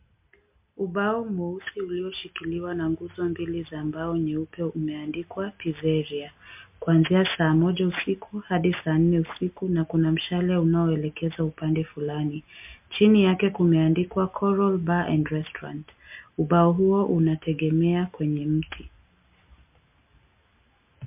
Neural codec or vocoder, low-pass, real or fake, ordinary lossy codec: none; 3.6 kHz; real; MP3, 32 kbps